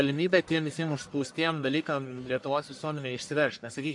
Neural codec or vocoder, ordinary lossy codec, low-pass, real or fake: codec, 44.1 kHz, 1.7 kbps, Pupu-Codec; MP3, 64 kbps; 10.8 kHz; fake